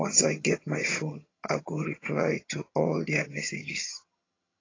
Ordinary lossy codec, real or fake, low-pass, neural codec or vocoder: AAC, 32 kbps; fake; 7.2 kHz; vocoder, 22.05 kHz, 80 mel bands, HiFi-GAN